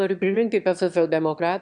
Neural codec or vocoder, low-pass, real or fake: autoencoder, 22.05 kHz, a latent of 192 numbers a frame, VITS, trained on one speaker; 9.9 kHz; fake